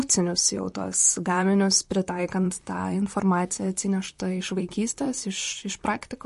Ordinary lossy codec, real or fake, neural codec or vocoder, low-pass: MP3, 48 kbps; fake; vocoder, 44.1 kHz, 128 mel bands, Pupu-Vocoder; 14.4 kHz